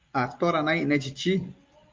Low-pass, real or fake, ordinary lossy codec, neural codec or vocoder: 7.2 kHz; real; Opus, 32 kbps; none